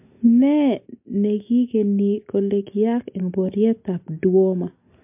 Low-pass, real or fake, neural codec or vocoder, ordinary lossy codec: 3.6 kHz; real; none; MP3, 32 kbps